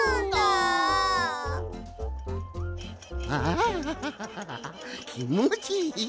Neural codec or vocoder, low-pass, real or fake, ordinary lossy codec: none; none; real; none